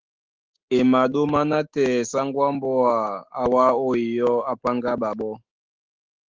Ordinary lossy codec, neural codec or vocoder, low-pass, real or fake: Opus, 16 kbps; autoencoder, 48 kHz, 128 numbers a frame, DAC-VAE, trained on Japanese speech; 7.2 kHz; fake